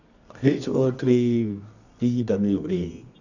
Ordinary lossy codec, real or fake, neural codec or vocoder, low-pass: none; fake; codec, 24 kHz, 0.9 kbps, WavTokenizer, medium music audio release; 7.2 kHz